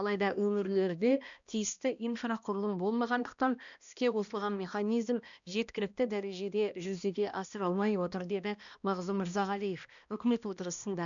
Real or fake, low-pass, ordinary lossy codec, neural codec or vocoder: fake; 7.2 kHz; none; codec, 16 kHz, 1 kbps, X-Codec, HuBERT features, trained on balanced general audio